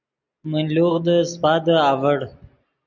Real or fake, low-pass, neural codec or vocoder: real; 7.2 kHz; none